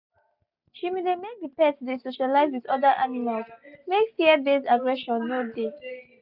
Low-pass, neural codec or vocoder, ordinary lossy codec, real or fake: 5.4 kHz; none; none; real